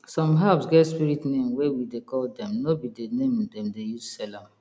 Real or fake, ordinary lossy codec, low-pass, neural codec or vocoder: real; none; none; none